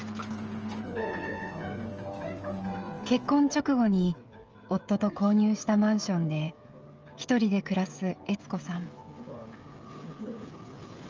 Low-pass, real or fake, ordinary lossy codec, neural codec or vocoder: 7.2 kHz; fake; Opus, 24 kbps; codec, 16 kHz, 16 kbps, FreqCodec, smaller model